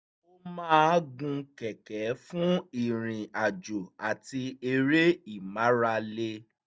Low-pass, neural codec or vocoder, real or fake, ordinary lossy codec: none; none; real; none